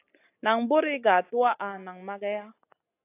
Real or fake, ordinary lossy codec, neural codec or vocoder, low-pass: real; AAC, 24 kbps; none; 3.6 kHz